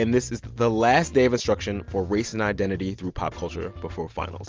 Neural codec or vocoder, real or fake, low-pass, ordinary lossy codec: none; real; 7.2 kHz; Opus, 32 kbps